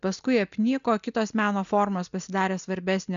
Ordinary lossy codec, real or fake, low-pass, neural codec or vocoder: AAC, 96 kbps; real; 7.2 kHz; none